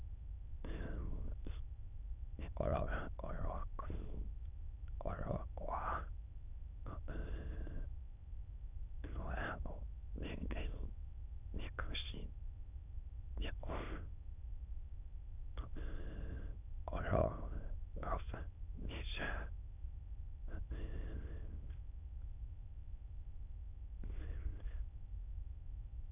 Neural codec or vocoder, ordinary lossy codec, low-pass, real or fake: autoencoder, 22.05 kHz, a latent of 192 numbers a frame, VITS, trained on many speakers; none; 3.6 kHz; fake